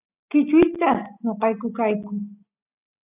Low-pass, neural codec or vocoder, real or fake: 3.6 kHz; none; real